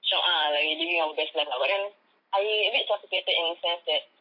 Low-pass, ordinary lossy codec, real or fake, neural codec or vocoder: 5.4 kHz; MP3, 32 kbps; fake; vocoder, 44.1 kHz, 128 mel bands every 256 samples, BigVGAN v2